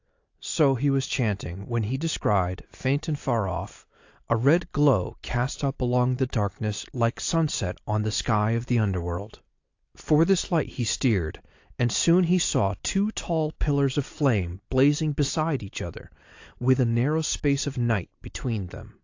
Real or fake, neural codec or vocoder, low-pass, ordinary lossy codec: real; none; 7.2 kHz; AAC, 48 kbps